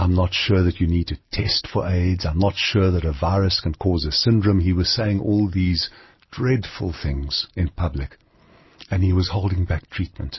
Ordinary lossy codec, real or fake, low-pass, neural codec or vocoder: MP3, 24 kbps; real; 7.2 kHz; none